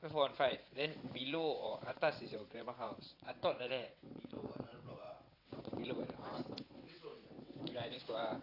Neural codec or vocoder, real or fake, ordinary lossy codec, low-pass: vocoder, 22.05 kHz, 80 mel bands, WaveNeXt; fake; AAC, 32 kbps; 5.4 kHz